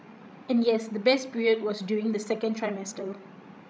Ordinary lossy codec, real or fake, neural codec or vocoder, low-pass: none; fake; codec, 16 kHz, 16 kbps, FreqCodec, larger model; none